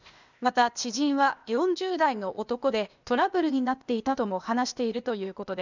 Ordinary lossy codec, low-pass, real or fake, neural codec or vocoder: none; 7.2 kHz; fake; codec, 16 kHz, 0.8 kbps, ZipCodec